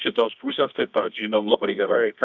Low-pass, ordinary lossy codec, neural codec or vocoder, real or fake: 7.2 kHz; Opus, 64 kbps; codec, 24 kHz, 0.9 kbps, WavTokenizer, medium music audio release; fake